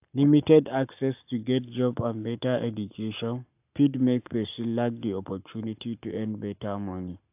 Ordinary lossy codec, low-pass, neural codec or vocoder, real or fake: AAC, 32 kbps; 3.6 kHz; codec, 44.1 kHz, 7.8 kbps, Pupu-Codec; fake